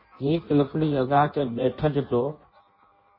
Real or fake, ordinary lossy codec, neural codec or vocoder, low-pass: fake; MP3, 24 kbps; codec, 16 kHz in and 24 kHz out, 0.6 kbps, FireRedTTS-2 codec; 5.4 kHz